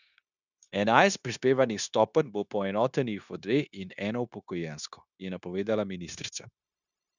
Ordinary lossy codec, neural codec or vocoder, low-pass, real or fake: none; codec, 16 kHz, 0.9 kbps, LongCat-Audio-Codec; 7.2 kHz; fake